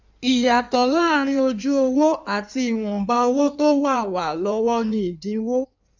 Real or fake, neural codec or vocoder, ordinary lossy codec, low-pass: fake; codec, 16 kHz in and 24 kHz out, 1.1 kbps, FireRedTTS-2 codec; none; 7.2 kHz